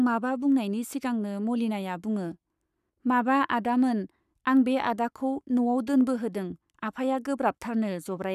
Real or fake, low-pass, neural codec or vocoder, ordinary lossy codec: fake; 14.4 kHz; codec, 44.1 kHz, 7.8 kbps, Pupu-Codec; none